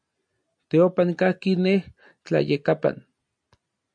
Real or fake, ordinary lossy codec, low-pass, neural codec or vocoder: real; MP3, 64 kbps; 9.9 kHz; none